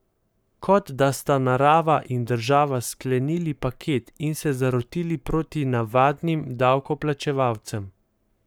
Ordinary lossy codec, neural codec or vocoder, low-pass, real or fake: none; codec, 44.1 kHz, 7.8 kbps, Pupu-Codec; none; fake